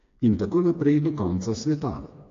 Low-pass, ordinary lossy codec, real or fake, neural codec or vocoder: 7.2 kHz; AAC, 48 kbps; fake; codec, 16 kHz, 2 kbps, FreqCodec, smaller model